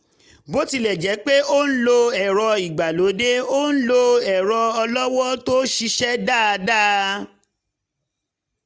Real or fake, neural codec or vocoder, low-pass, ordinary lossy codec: real; none; none; none